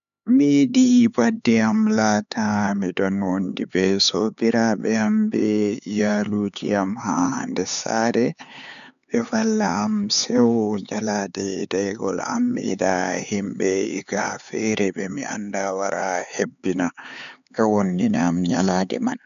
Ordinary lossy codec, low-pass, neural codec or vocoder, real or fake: none; 7.2 kHz; codec, 16 kHz, 4 kbps, X-Codec, HuBERT features, trained on LibriSpeech; fake